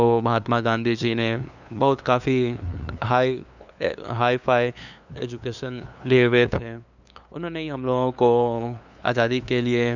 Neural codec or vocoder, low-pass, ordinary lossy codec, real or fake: codec, 16 kHz, 2 kbps, FunCodec, trained on LibriTTS, 25 frames a second; 7.2 kHz; none; fake